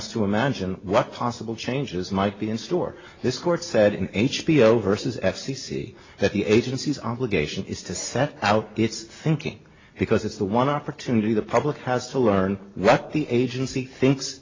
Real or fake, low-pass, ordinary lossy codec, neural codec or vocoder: real; 7.2 kHz; AAC, 32 kbps; none